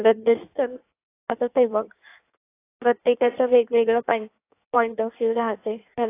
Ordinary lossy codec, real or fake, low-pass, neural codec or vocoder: AAC, 24 kbps; fake; 3.6 kHz; codec, 16 kHz in and 24 kHz out, 1.1 kbps, FireRedTTS-2 codec